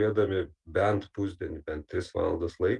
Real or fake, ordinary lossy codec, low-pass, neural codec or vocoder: real; Opus, 24 kbps; 10.8 kHz; none